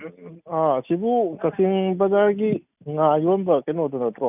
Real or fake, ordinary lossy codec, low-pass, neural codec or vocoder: real; none; 3.6 kHz; none